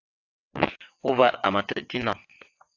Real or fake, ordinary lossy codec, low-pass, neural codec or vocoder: fake; AAC, 48 kbps; 7.2 kHz; vocoder, 22.05 kHz, 80 mel bands, WaveNeXt